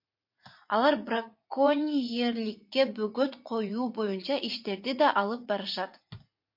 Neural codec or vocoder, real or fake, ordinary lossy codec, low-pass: vocoder, 44.1 kHz, 80 mel bands, Vocos; fake; MP3, 32 kbps; 5.4 kHz